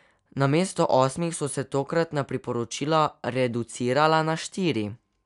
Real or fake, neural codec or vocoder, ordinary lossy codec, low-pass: real; none; none; 10.8 kHz